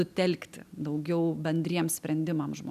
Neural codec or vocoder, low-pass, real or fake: none; 14.4 kHz; real